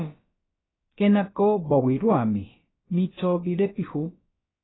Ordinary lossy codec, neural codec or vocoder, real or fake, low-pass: AAC, 16 kbps; codec, 16 kHz, about 1 kbps, DyCAST, with the encoder's durations; fake; 7.2 kHz